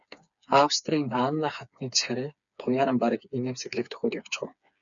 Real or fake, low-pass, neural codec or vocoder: fake; 7.2 kHz; codec, 16 kHz, 4 kbps, FreqCodec, smaller model